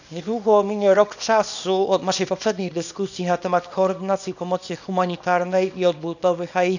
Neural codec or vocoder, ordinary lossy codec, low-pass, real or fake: codec, 24 kHz, 0.9 kbps, WavTokenizer, small release; none; 7.2 kHz; fake